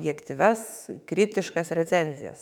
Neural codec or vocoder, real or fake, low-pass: autoencoder, 48 kHz, 32 numbers a frame, DAC-VAE, trained on Japanese speech; fake; 19.8 kHz